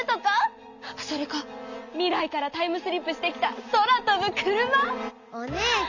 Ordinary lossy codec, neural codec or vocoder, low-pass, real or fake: none; none; 7.2 kHz; real